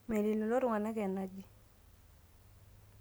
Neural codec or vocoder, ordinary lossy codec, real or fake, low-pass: none; none; real; none